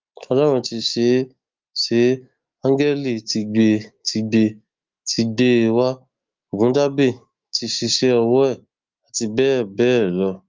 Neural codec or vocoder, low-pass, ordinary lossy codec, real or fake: autoencoder, 48 kHz, 128 numbers a frame, DAC-VAE, trained on Japanese speech; 7.2 kHz; Opus, 32 kbps; fake